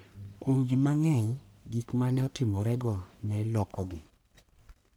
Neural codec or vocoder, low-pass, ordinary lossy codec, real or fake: codec, 44.1 kHz, 1.7 kbps, Pupu-Codec; none; none; fake